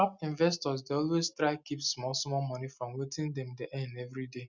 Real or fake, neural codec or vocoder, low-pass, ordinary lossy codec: real; none; 7.2 kHz; none